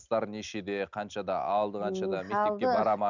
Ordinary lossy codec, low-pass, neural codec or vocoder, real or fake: none; 7.2 kHz; none; real